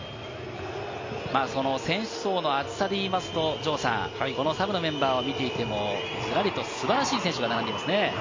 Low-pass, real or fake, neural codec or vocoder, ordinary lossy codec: 7.2 kHz; real; none; MP3, 32 kbps